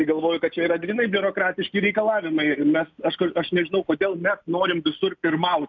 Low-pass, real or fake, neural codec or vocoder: 7.2 kHz; real; none